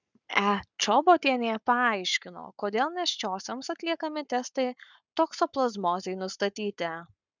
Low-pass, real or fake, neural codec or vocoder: 7.2 kHz; fake; codec, 44.1 kHz, 7.8 kbps, Pupu-Codec